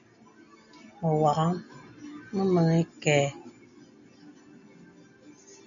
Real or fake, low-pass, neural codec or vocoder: real; 7.2 kHz; none